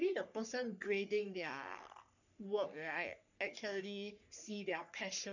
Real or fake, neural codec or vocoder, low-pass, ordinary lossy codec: fake; codec, 44.1 kHz, 3.4 kbps, Pupu-Codec; 7.2 kHz; none